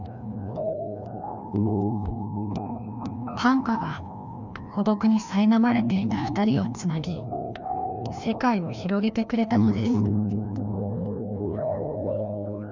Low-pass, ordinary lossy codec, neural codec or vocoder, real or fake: 7.2 kHz; none; codec, 16 kHz, 1 kbps, FreqCodec, larger model; fake